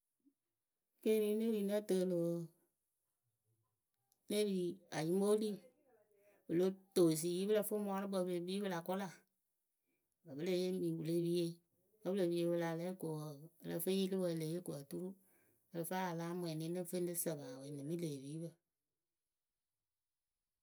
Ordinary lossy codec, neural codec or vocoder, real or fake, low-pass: none; none; real; none